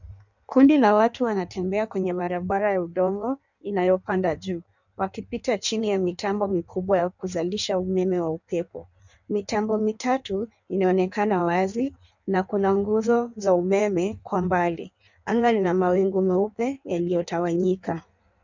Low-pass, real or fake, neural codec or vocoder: 7.2 kHz; fake; codec, 16 kHz in and 24 kHz out, 1.1 kbps, FireRedTTS-2 codec